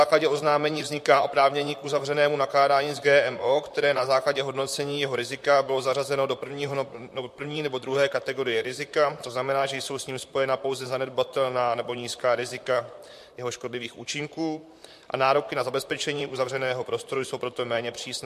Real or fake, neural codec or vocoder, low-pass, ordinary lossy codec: fake; vocoder, 44.1 kHz, 128 mel bands, Pupu-Vocoder; 14.4 kHz; MP3, 64 kbps